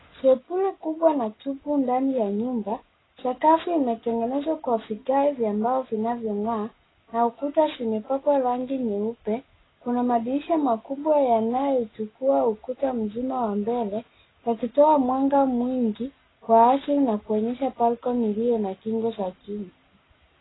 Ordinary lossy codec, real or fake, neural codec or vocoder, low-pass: AAC, 16 kbps; real; none; 7.2 kHz